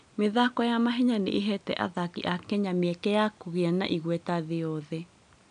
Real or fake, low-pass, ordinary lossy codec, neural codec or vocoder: real; 9.9 kHz; none; none